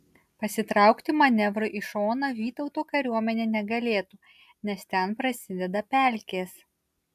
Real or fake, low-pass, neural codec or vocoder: real; 14.4 kHz; none